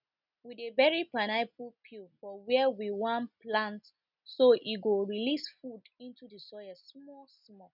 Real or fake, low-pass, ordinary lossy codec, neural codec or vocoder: real; 5.4 kHz; none; none